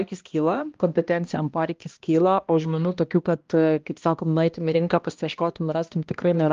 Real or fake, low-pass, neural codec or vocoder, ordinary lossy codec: fake; 7.2 kHz; codec, 16 kHz, 1 kbps, X-Codec, HuBERT features, trained on balanced general audio; Opus, 32 kbps